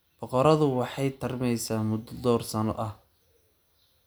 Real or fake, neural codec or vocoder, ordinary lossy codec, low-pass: real; none; none; none